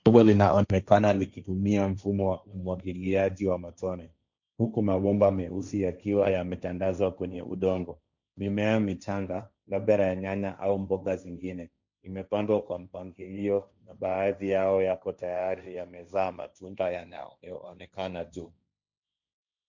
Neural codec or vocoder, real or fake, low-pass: codec, 16 kHz, 1.1 kbps, Voila-Tokenizer; fake; 7.2 kHz